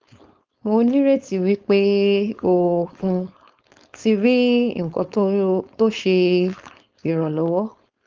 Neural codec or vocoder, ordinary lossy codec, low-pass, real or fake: codec, 16 kHz, 4.8 kbps, FACodec; Opus, 24 kbps; 7.2 kHz; fake